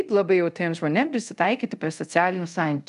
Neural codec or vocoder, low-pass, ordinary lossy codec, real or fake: codec, 24 kHz, 0.5 kbps, DualCodec; 10.8 kHz; AAC, 96 kbps; fake